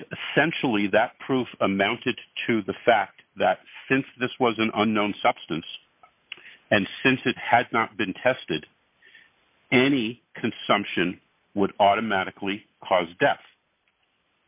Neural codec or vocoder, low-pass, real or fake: none; 3.6 kHz; real